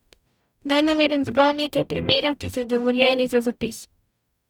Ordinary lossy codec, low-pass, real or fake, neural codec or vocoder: none; 19.8 kHz; fake; codec, 44.1 kHz, 0.9 kbps, DAC